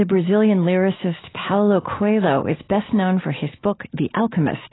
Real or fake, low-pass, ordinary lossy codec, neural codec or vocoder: real; 7.2 kHz; AAC, 16 kbps; none